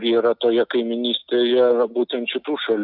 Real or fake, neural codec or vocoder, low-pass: real; none; 5.4 kHz